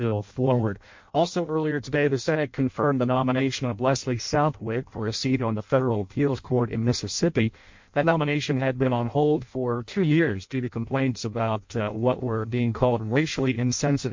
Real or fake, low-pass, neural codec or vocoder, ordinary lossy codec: fake; 7.2 kHz; codec, 16 kHz in and 24 kHz out, 0.6 kbps, FireRedTTS-2 codec; MP3, 48 kbps